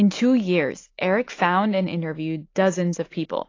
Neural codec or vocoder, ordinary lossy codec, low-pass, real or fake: none; AAC, 32 kbps; 7.2 kHz; real